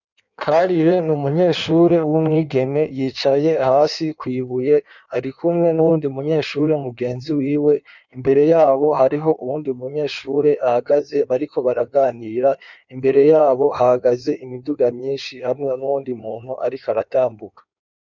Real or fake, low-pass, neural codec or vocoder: fake; 7.2 kHz; codec, 16 kHz in and 24 kHz out, 1.1 kbps, FireRedTTS-2 codec